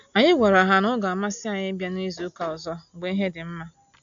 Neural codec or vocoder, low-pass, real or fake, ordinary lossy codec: none; 7.2 kHz; real; AAC, 64 kbps